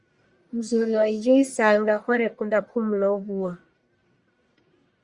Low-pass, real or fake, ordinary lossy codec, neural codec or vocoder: 10.8 kHz; fake; Opus, 64 kbps; codec, 44.1 kHz, 1.7 kbps, Pupu-Codec